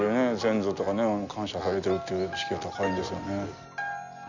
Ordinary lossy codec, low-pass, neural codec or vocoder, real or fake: none; 7.2 kHz; none; real